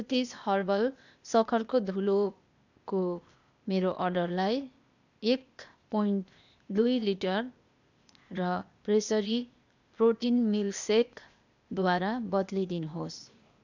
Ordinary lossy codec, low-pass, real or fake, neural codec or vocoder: none; 7.2 kHz; fake; codec, 16 kHz, 0.8 kbps, ZipCodec